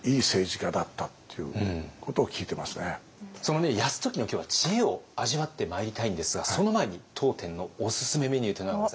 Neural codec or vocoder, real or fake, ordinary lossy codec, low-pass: none; real; none; none